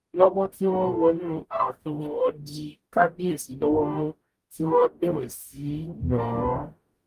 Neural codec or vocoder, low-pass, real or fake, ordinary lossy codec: codec, 44.1 kHz, 0.9 kbps, DAC; 14.4 kHz; fake; Opus, 32 kbps